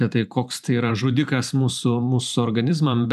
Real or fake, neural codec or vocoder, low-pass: fake; vocoder, 44.1 kHz, 128 mel bands every 512 samples, BigVGAN v2; 14.4 kHz